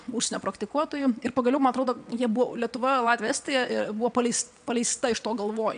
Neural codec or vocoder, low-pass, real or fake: vocoder, 22.05 kHz, 80 mel bands, WaveNeXt; 9.9 kHz; fake